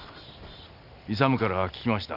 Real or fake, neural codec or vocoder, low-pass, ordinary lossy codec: real; none; 5.4 kHz; none